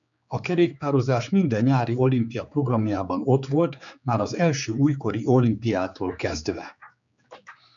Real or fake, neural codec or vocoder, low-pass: fake; codec, 16 kHz, 4 kbps, X-Codec, HuBERT features, trained on general audio; 7.2 kHz